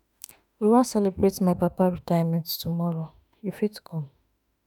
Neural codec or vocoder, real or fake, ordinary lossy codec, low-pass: autoencoder, 48 kHz, 32 numbers a frame, DAC-VAE, trained on Japanese speech; fake; none; 19.8 kHz